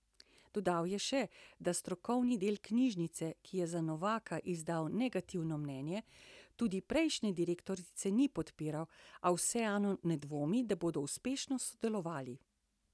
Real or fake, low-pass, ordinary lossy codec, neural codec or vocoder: real; none; none; none